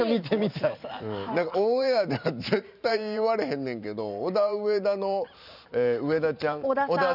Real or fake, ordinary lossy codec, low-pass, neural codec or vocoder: fake; none; 5.4 kHz; vocoder, 44.1 kHz, 128 mel bands every 512 samples, BigVGAN v2